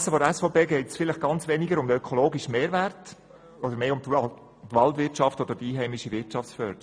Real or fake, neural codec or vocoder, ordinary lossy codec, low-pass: real; none; none; 9.9 kHz